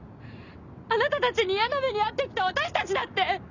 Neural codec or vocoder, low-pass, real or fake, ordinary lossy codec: none; 7.2 kHz; real; none